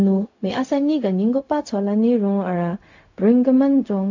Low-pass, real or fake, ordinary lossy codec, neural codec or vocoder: 7.2 kHz; fake; AAC, 48 kbps; codec, 16 kHz, 0.4 kbps, LongCat-Audio-Codec